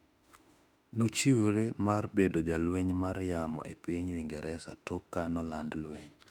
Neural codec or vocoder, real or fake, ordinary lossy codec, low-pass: autoencoder, 48 kHz, 32 numbers a frame, DAC-VAE, trained on Japanese speech; fake; none; 19.8 kHz